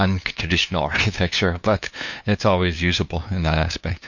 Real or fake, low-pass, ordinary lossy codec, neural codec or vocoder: fake; 7.2 kHz; MP3, 48 kbps; codec, 16 kHz, 2 kbps, FunCodec, trained on LibriTTS, 25 frames a second